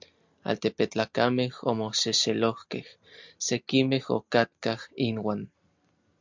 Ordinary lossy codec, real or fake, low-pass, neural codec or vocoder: MP3, 64 kbps; real; 7.2 kHz; none